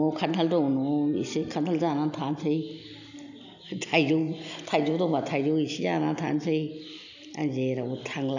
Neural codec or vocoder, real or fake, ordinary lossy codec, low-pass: none; real; none; 7.2 kHz